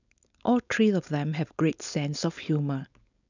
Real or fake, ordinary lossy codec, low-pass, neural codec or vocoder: fake; none; 7.2 kHz; codec, 16 kHz, 4.8 kbps, FACodec